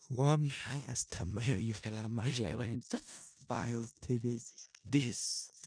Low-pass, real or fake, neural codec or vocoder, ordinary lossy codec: 9.9 kHz; fake; codec, 16 kHz in and 24 kHz out, 0.4 kbps, LongCat-Audio-Codec, four codebook decoder; none